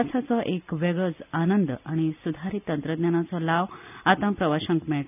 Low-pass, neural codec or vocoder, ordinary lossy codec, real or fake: 3.6 kHz; none; none; real